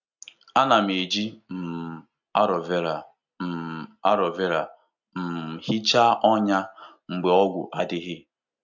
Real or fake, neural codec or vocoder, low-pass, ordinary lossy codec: real; none; 7.2 kHz; none